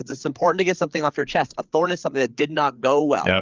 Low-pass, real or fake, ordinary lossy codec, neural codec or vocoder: 7.2 kHz; fake; Opus, 32 kbps; codec, 16 kHz, 4 kbps, FreqCodec, larger model